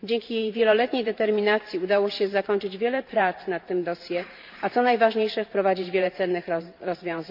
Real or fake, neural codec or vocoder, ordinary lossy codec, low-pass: fake; vocoder, 44.1 kHz, 128 mel bands every 512 samples, BigVGAN v2; MP3, 48 kbps; 5.4 kHz